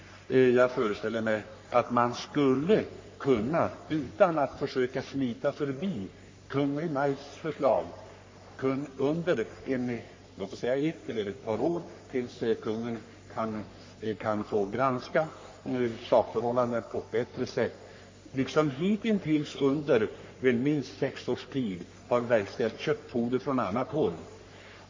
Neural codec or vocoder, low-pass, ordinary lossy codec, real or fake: codec, 44.1 kHz, 3.4 kbps, Pupu-Codec; 7.2 kHz; MP3, 32 kbps; fake